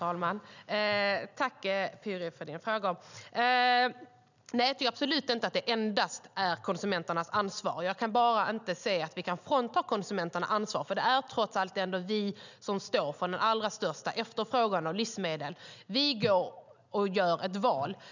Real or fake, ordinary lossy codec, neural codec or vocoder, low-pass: real; none; none; 7.2 kHz